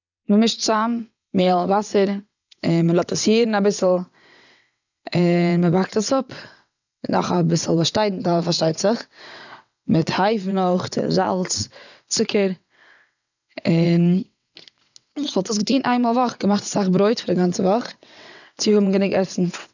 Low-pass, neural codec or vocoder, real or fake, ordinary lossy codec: 7.2 kHz; vocoder, 22.05 kHz, 80 mel bands, WaveNeXt; fake; none